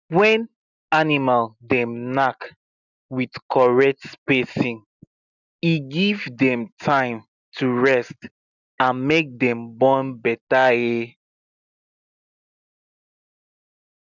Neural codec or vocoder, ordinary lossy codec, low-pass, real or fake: none; none; 7.2 kHz; real